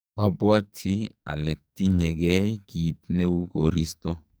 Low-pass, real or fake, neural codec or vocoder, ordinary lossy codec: none; fake; codec, 44.1 kHz, 2.6 kbps, SNAC; none